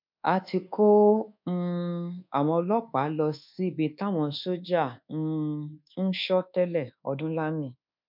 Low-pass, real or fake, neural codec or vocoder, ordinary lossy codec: 5.4 kHz; fake; codec, 24 kHz, 1.2 kbps, DualCodec; MP3, 48 kbps